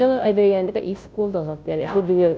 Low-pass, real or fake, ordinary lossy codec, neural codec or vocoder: none; fake; none; codec, 16 kHz, 0.5 kbps, FunCodec, trained on Chinese and English, 25 frames a second